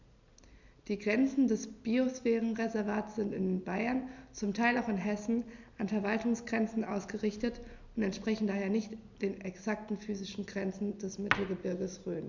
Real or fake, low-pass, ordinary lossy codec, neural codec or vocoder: real; 7.2 kHz; none; none